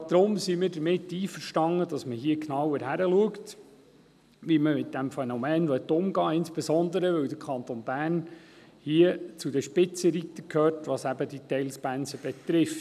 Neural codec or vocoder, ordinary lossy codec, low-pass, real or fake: none; none; 14.4 kHz; real